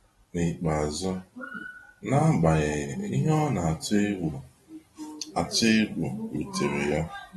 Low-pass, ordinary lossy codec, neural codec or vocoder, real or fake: 19.8 kHz; AAC, 32 kbps; none; real